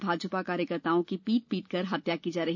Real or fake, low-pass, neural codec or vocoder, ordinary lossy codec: real; 7.2 kHz; none; none